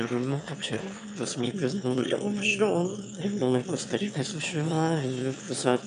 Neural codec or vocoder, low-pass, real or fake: autoencoder, 22.05 kHz, a latent of 192 numbers a frame, VITS, trained on one speaker; 9.9 kHz; fake